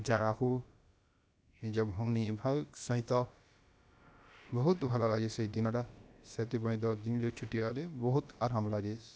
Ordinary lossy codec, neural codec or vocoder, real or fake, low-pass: none; codec, 16 kHz, about 1 kbps, DyCAST, with the encoder's durations; fake; none